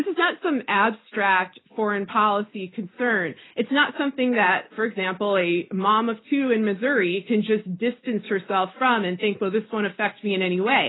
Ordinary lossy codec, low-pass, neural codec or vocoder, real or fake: AAC, 16 kbps; 7.2 kHz; none; real